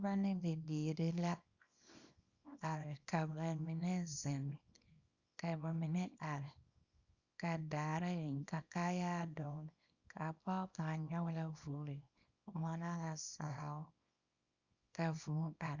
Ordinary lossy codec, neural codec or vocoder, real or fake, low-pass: Opus, 64 kbps; codec, 24 kHz, 0.9 kbps, WavTokenizer, small release; fake; 7.2 kHz